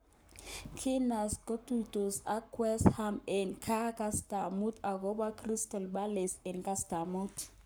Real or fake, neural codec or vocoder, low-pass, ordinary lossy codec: fake; codec, 44.1 kHz, 7.8 kbps, Pupu-Codec; none; none